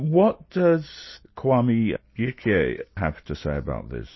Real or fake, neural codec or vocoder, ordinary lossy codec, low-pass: real; none; MP3, 24 kbps; 7.2 kHz